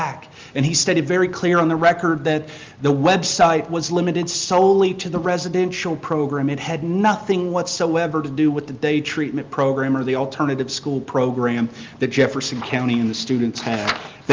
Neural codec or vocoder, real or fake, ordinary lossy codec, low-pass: none; real; Opus, 32 kbps; 7.2 kHz